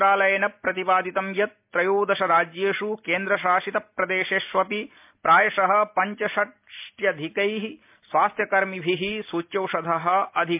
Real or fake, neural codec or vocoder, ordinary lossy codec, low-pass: real; none; MP3, 32 kbps; 3.6 kHz